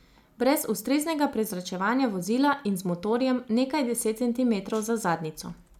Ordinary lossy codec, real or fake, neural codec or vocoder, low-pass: none; real; none; 19.8 kHz